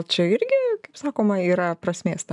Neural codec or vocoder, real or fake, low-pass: none; real; 10.8 kHz